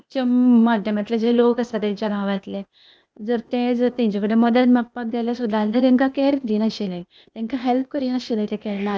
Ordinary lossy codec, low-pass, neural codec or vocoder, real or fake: none; none; codec, 16 kHz, 0.8 kbps, ZipCodec; fake